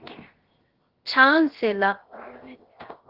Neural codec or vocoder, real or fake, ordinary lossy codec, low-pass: codec, 16 kHz, 0.7 kbps, FocalCodec; fake; Opus, 16 kbps; 5.4 kHz